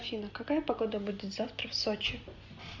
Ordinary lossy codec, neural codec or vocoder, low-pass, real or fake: Opus, 64 kbps; none; 7.2 kHz; real